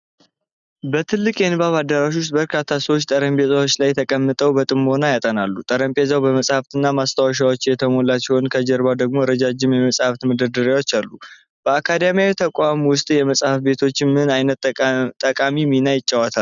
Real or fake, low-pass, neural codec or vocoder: real; 7.2 kHz; none